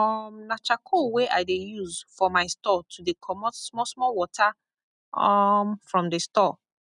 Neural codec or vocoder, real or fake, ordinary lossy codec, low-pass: none; real; none; 10.8 kHz